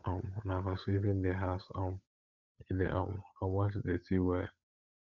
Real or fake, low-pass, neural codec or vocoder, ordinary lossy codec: fake; 7.2 kHz; codec, 16 kHz, 8 kbps, FunCodec, trained on Chinese and English, 25 frames a second; none